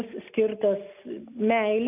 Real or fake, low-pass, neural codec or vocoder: real; 3.6 kHz; none